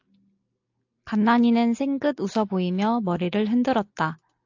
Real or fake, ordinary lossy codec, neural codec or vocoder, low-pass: real; AAC, 48 kbps; none; 7.2 kHz